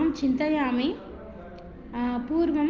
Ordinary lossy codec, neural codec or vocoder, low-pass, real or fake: Opus, 32 kbps; none; 7.2 kHz; real